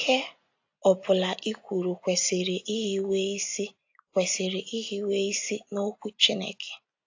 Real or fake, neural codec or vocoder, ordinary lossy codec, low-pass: real; none; AAC, 48 kbps; 7.2 kHz